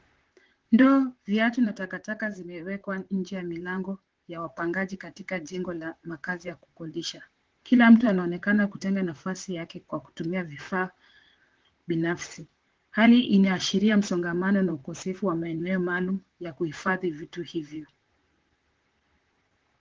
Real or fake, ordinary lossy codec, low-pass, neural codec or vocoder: fake; Opus, 16 kbps; 7.2 kHz; vocoder, 44.1 kHz, 128 mel bands, Pupu-Vocoder